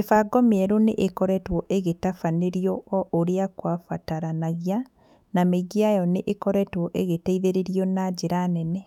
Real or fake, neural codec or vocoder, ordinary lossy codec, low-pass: fake; autoencoder, 48 kHz, 128 numbers a frame, DAC-VAE, trained on Japanese speech; none; 19.8 kHz